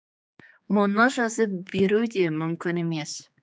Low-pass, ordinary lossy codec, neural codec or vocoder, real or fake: none; none; codec, 16 kHz, 4 kbps, X-Codec, HuBERT features, trained on general audio; fake